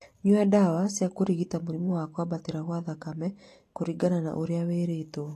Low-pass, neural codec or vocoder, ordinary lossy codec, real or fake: 14.4 kHz; none; AAC, 48 kbps; real